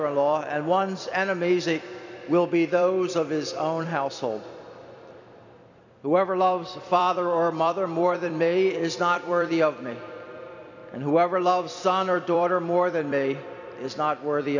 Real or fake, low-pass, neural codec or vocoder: fake; 7.2 kHz; vocoder, 44.1 kHz, 128 mel bands every 256 samples, BigVGAN v2